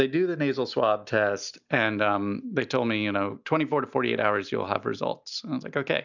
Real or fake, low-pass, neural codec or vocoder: real; 7.2 kHz; none